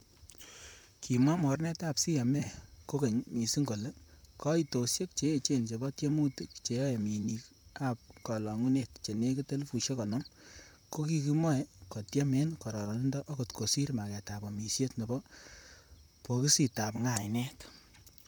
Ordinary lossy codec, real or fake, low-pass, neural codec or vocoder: none; fake; none; vocoder, 44.1 kHz, 128 mel bands, Pupu-Vocoder